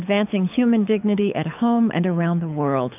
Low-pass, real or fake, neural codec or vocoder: 3.6 kHz; fake; codec, 24 kHz, 6 kbps, HILCodec